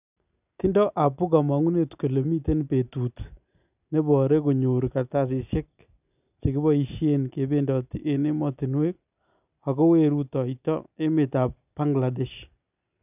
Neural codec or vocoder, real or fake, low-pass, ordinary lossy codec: none; real; 3.6 kHz; none